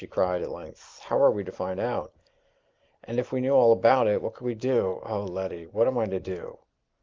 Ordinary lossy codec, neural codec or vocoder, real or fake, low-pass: Opus, 32 kbps; none; real; 7.2 kHz